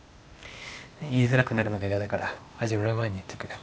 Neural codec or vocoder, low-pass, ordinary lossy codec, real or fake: codec, 16 kHz, 0.8 kbps, ZipCodec; none; none; fake